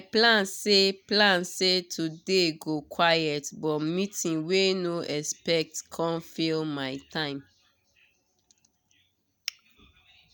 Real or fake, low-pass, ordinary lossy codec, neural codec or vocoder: real; none; none; none